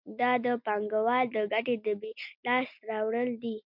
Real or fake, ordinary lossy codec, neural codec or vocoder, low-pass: real; MP3, 48 kbps; none; 5.4 kHz